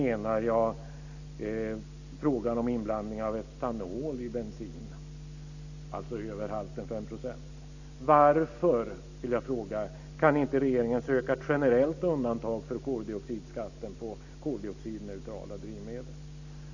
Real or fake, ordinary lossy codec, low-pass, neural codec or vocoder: real; none; 7.2 kHz; none